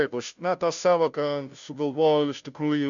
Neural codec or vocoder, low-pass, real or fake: codec, 16 kHz, 0.5 kbps, FunCodec, trained on Chinese and English, 25 frames a second; 7.2 kHz; fake